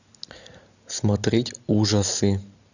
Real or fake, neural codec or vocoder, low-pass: real; none; 7.2 kHz